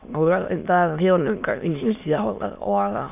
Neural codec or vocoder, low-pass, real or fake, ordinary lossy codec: autoencoder, 22.05 kHz, a latent of 192 numbers a frame, VITS, trained on many speakers; 3.6 kHz; fake; none